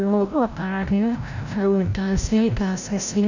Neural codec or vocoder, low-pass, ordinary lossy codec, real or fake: codec, 16 kHz, 0.5 kbps, FreqCodec, larger model; 7.2 kHz; none; fake